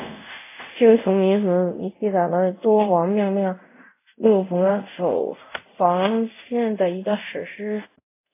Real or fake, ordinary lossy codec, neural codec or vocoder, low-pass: fake; AAC, 24 kbps; codec, 24 kHz, 0.5 kbps, DualCodec; 3.6 kHz